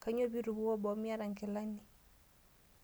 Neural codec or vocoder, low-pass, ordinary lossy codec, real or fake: none; none; none; real